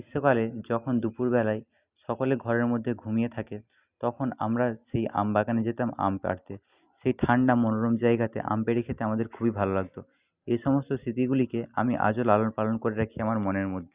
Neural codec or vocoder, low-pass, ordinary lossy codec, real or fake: none; 3.6 kHz; Opus, 64 kbps; real